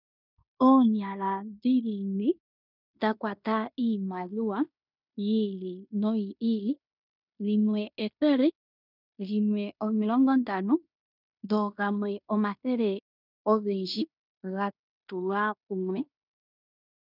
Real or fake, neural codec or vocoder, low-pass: fake; codec, 16 kHz in and 24 kHz out, 0.9 kbps, LongCat-Audio-Codec, fine tuned four codebook decoder; 5.4 kHz